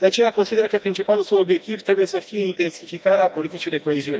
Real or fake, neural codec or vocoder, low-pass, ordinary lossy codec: fake; codec, 16 kHz, 1 kbps, FreqCodec, smaller model; none; none